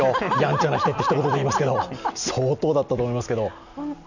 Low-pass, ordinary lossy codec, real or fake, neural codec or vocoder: 7.2 kHz; none; real; none